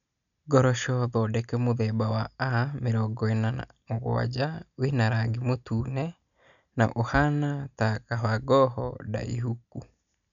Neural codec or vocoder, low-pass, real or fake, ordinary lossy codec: none; 7.2 kHz; real; none